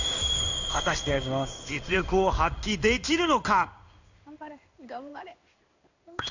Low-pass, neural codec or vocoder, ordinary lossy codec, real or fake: 7.2 kHz; codec, 16 kHz in and 24 kHz out, 1 kbps, XY-Tokenizer; none; fake